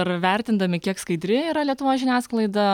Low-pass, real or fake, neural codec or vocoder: 19.8 kHz; real; none